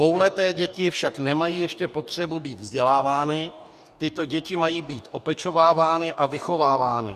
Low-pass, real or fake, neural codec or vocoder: 14.4 kHz; fake; codec, 44.1 kHz, 2.6 kbps, DAC